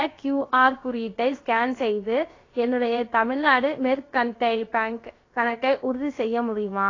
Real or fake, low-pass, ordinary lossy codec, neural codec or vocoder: fake; 7.2 kHz; AAC, 32 kbps; codec, 16 kHz, about 1 kbps, DyCAST, with the encoder's durations